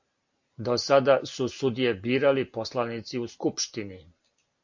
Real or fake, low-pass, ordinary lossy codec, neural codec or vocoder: real; 7.2 kHz; MP3, 48 kbps; none